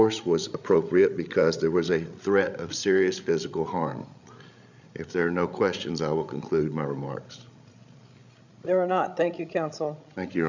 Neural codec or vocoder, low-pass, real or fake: codec, 16 kHz, 16 kbps, FreqCodec, larger model; 7.2 kHz; fake